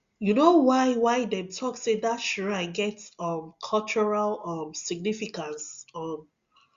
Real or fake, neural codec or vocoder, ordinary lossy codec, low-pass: real; none; Opus, 64 kbps; 7.2 kHz